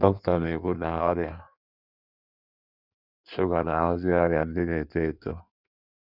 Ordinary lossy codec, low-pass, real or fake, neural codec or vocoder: none; 5.4 kHz; fake; codec, 16 kHz in and 24 kHz out, 1.1 kbps, FireRedTTS-2 codec